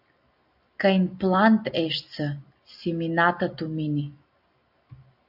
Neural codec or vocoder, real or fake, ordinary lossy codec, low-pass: none; real; AAC, 48 kbps; 5.4 kHz